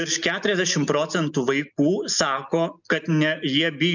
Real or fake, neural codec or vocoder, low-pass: real; none; 7.2 kHz